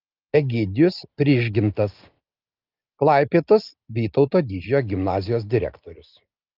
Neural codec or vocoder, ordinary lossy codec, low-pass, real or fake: none; Opus, 32 kbps; 5.4 kHz; real